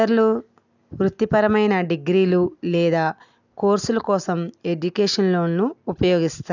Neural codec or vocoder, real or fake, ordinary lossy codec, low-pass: none; real; none; 7.2 kHz